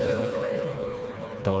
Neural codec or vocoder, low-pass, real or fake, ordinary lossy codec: codec, 16 kHz, 2 kbps, FreqCodec, smaller model; none; fake; none